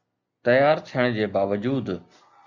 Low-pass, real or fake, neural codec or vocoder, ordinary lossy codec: 7.2 kHz; real; none; Opus, 64 kbps